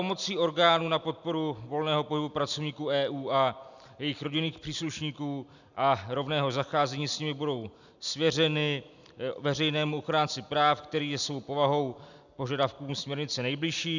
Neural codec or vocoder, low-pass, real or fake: none; 7.2 kHz; real